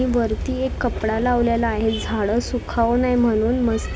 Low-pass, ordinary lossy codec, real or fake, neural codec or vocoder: none; none; real; none